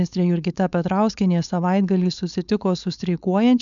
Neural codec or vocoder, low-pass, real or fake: codec, 16 kHz, 4.8 kbps, FACodec; 7.2 kHz; fake